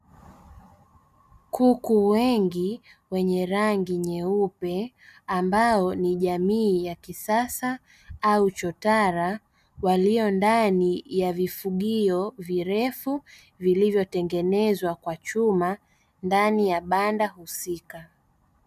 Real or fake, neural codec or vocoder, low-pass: real; none; 14.4 kHz